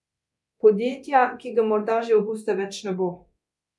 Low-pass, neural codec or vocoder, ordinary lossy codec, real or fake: none; codec, 24 kHz, 0.9 kbps, DualCodec; none; fake